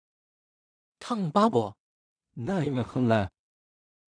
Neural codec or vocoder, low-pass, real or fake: codec, 16 kHz in and 24 kHz out, 0.4 kbps, LongCat-Audio-Codec, two codebook decoder; 9.9 kHz; fake